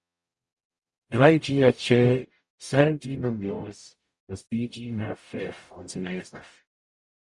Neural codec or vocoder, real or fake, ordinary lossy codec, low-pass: codec, 44.1 kHz, 0.9 kbps, DAC; fake; Opus, 64 kbps; 10.8 kHz